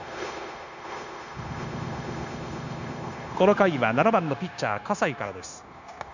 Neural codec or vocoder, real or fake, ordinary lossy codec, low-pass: codec, 16 kHz, 0.9 kbps, LongCat-Audio-Codec; fake; none; 7.2 kHz